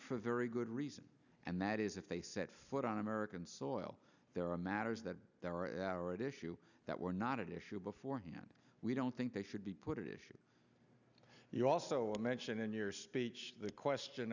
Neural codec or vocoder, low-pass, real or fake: none; 7.2 kHz; real